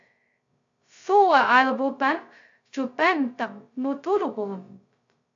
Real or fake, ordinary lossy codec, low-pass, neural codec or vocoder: fake; AAC, 64 kbps; 7.2 kHz; codec, 16 kHz, 0.2 kbps, FocalCodec